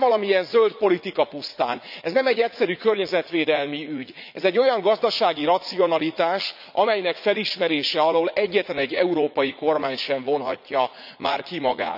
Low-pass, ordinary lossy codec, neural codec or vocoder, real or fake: 5.4 kHz; none; vocoder, 44.1 kHz, 80 mel bands, Vocos; fake